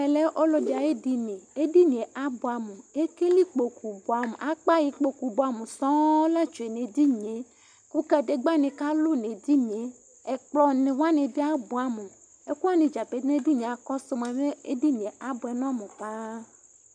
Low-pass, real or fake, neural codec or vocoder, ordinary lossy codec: 9.9 kHz; real; none; MP3, 96 kbps